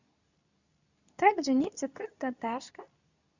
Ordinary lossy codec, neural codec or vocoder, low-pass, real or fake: none; codec, 24 kHz, 0.9 kbps, WavTokenizer, medium speech release version 2; 7.2 kHz; fake